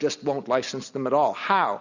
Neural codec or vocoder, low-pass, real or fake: none; 7.2 kHz; real